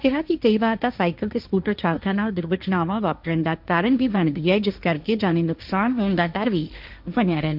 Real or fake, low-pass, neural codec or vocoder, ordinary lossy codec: fake; 5.4 kHz; codec, 16 kHz, 1.1 kbps, Voila-Tokenizer; none